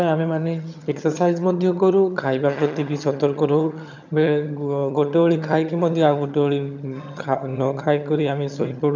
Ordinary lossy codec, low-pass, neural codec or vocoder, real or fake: none; 7.2 kHz; vocoder, 22.05 kHz, 80 mel bands, HiFi-GAN; fake